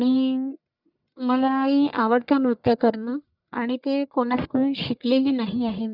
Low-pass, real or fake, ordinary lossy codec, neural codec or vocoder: 5.4 kHz; fake; none; codec, 44.1 kHz, 1.7 kbps, Pupu-Codec